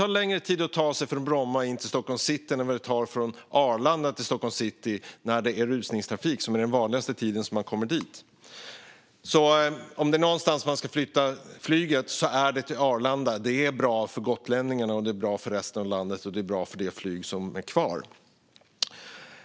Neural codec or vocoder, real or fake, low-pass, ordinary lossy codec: none; real; none; none